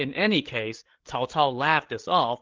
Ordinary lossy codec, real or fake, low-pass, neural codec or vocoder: Opus, 16 kbps; fake; 7.2 kHz; codec, 16 kHz, 8 kbps, FreqCodec, larger model